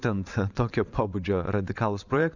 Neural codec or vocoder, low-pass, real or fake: vocoder, 22.05 kHz, 80 mel bands, WaveNeXt; 7.2 kHz; fake